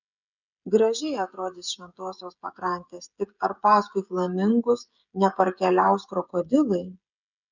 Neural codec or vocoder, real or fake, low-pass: codec, 16 kHz, 16 kbps, FreqCodec, smaller model; fake; 7.2 kHz